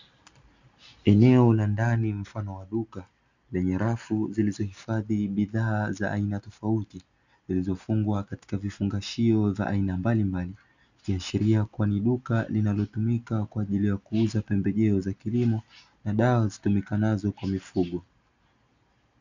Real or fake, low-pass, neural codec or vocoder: real; 7.2 kHz; none